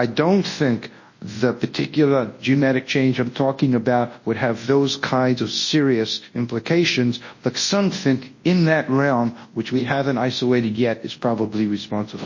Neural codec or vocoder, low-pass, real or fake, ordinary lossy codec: codec, 24 kHz, 0.9 kbps, WavTokenizer, large speech release; 7.2 kHz; fake; MP3, 32 kbps